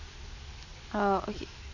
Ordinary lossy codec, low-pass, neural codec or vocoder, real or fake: none; 7.2 kHz; none; real